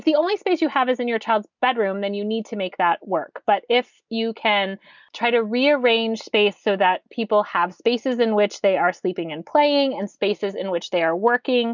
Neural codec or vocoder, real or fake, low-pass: none; real; 7.2 kHz